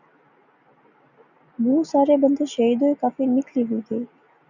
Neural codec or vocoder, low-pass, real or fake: none; 7.2 kHz; real